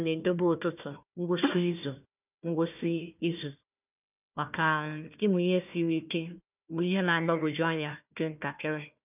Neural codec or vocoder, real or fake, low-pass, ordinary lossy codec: codec, 16 kHz, 1 kbps, FunCodec, trained on Chinese and English, 50 frames a second; fake; 3.6 kHz; none